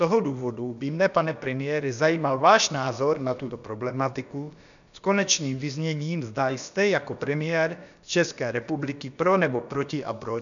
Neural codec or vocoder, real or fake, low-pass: codec, 16 kHz, about 1 kbps, DyCAST, with the encoder's durations; fake; 7.2 kHz